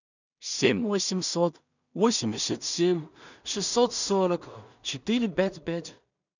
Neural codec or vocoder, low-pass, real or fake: codec, 16 kHz in and 24 kHz out, 0.4 kbps, LongCat-Audio-Codec, two codebook decoder; 7.2 kHz; fake